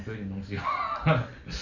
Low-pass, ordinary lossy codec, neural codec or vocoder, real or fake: 7.2 kHz; none; none; real